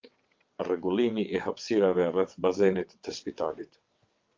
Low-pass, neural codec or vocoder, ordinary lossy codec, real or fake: 7.2 kHz; vocoder, 22.05 kHz, 80 mel bands, WaveNeXt; Opus, 24 kbps; fake